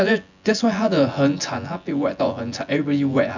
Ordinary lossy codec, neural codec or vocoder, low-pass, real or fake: none; vocoder, 24 kHz, 100 mel bands, Vocos; 7.2 kHz; fake